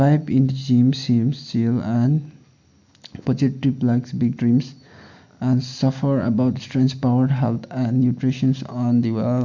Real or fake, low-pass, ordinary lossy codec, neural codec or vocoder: real; 7.2 kHz; none; none